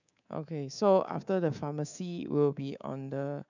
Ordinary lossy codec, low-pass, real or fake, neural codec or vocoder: none; 7.2 kHz; fake; codec, 24 kHz, 3.1 kbps, DualCodec